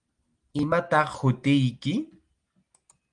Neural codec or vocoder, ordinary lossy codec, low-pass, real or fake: none; Opus, 24 kbps; 9.9 kHz; real